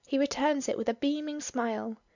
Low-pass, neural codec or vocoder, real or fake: 7.2 kHz; none; real